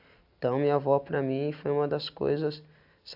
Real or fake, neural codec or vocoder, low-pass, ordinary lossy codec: real; none; 5.4 kHz; AAC, 48 kbps